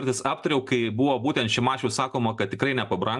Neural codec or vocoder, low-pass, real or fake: none; 10.8 kHz; real